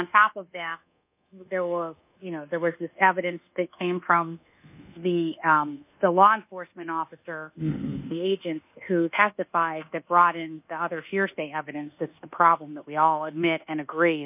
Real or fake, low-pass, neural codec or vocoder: fake; 3.6 kHz; codec, 24 kHz, 1.2 kbps, DualCodec